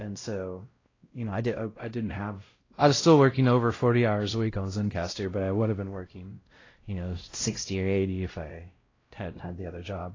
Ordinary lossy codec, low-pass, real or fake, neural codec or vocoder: AAC, 32 kbps; 7.2 kHz; fake; codec, 16 kHz, 0.5 kbps, X-Codec, WavLM features, trained on Multilingual LibriSpeech